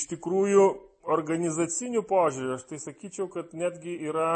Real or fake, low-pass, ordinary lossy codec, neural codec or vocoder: real; 9.9 kHz; MP3, 32 kbps; none